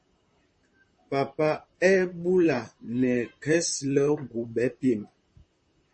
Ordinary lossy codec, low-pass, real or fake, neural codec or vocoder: MP3, 32 kbps; 10.8 kHz; fake; vocoder, 44.1 kHz, 128 mel bands, Pupu-Vocoder